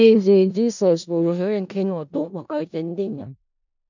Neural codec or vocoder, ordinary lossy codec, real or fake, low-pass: codec, 16 kHz in and 24 kHz out, 0.4 kbps, LongCat-Audio-Codec, four codebook decoder; none; fake; 7.2 kHz